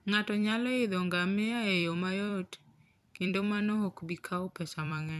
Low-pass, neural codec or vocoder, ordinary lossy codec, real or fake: 14.4 kHz; vocoder, 44.1 kHz, 128 mel bands every 256 samples, BigVGAN v2; none; fake